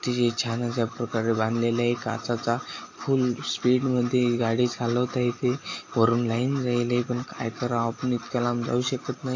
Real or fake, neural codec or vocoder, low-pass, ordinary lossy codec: real; none; 7.2 kHz; AAC, 32 kbps